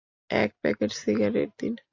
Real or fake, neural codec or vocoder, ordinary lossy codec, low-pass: real; none; AAC, 48 kbps; 7.2 kHz